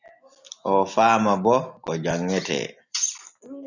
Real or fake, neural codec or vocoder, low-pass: real; none; 7.2 kHz